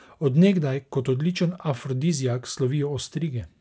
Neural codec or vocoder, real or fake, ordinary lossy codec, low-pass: none; real; none; none